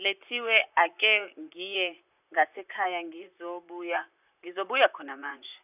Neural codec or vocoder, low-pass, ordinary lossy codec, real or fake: vocoder, 44.1 kHz, 128 mel bands, Pupu-Vocoder; 3.6 kHz; none; fake